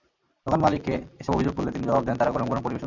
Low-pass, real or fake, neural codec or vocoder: 7.2 kHz; real; none